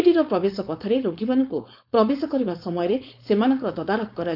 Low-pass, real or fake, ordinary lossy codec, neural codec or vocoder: 5.4 kHz; fake; none; codec, 16 kHz, 4.8 kbps, FACodec